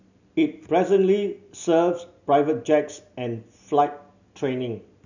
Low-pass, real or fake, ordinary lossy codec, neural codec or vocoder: 7.2 kHz; real; none; none